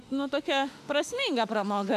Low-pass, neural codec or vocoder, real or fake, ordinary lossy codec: 14.4 kHz; autoencoder, 48 kHz, 32 numbers a frame, DAC-VAE, trained on Japanese speech; fake; AAC, 96 kbps